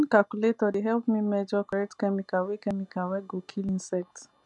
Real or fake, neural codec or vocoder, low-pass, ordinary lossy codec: real; none; 10.8 kHz; none